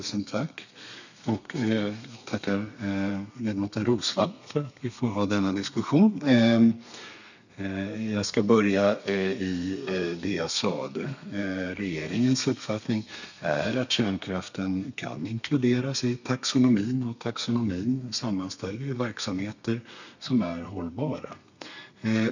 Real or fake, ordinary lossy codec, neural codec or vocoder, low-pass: fake; none; codec, 32 kHz, 1.9 kbps, SNAC; 7.2 kHz